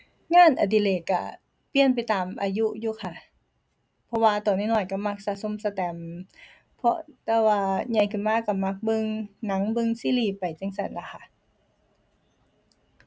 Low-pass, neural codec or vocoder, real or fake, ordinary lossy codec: none; none; real; none